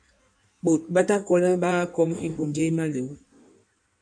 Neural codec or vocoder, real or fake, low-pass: codec, 16 kHz in and 24 kHz out, 1.1 kbps, FireRedTTS-2 codec; fake; 9.9 kHz